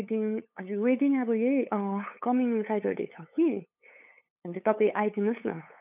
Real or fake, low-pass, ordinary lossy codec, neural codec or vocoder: fake; 3.6 kHz; none; codec, 16 kHz, 8 kbps, FunCodec, trained on LibriTTS, 25 frames a second